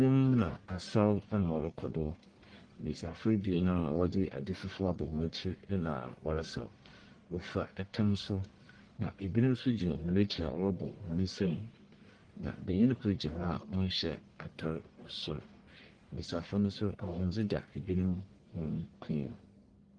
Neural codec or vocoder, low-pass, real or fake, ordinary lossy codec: codec, 44.1 kHz, 1.7 kbps, Pupu-Codec; 9.9 kHz; fake; Opus, 24 kbps